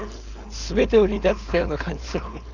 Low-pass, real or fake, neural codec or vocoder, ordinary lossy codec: 7.2 kHz; fake; codec, 16 kHz, 4.8 kbps, FACodec; none